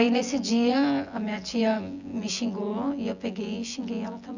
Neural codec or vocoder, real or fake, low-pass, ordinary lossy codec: vocoder, 24 kHz, 100 mel bands, Vocos; fake; 7.2 kHz; none